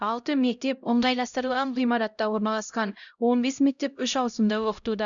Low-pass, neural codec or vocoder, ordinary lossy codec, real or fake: 7.2 kHz; codec, 16 kHz, 0.5 kbps, X-Codec, HuBERT features, trained on LibriSpeech; none; fake